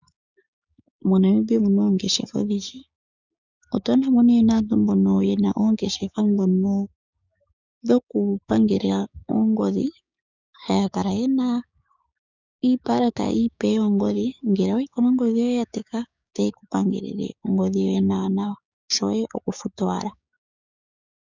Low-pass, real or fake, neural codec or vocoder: 7.2 kHz; fake; codec, 16 kHz, 6 kbps, DAC